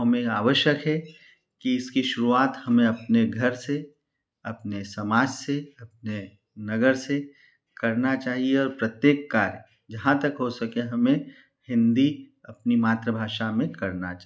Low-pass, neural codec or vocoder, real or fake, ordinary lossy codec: none; none; real; none